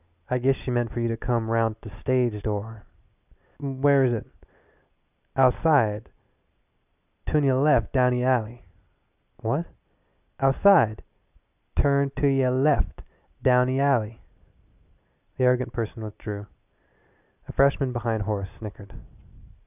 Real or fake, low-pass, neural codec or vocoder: real; 3.6 kHz; none